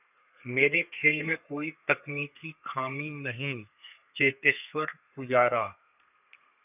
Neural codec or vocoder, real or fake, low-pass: codec, 32 kHz, 1.9 kbps, SNAC; fake; 3.6 kHz